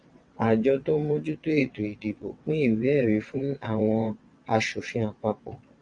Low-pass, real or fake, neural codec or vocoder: 9.9 kHz; fake; vocoder, 22.05 kHz, 80 mel bands, WaveNeXt